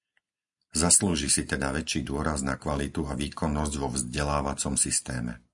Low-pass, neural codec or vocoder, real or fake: 10.8 kHz; none; real